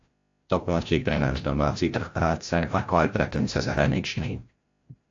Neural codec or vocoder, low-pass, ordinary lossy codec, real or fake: codec, 16 kHz, 0.5 kbps, FreqCodec, larger model; 7.2 kHz; AAC, 48 kbps; fake